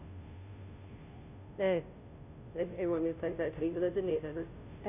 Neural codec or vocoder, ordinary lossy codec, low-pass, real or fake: codec, 16 kHz, 0.5 kbps, FunCodec, trained on Chinese and English, 25 frames a second; none; 3.6 kHz; fake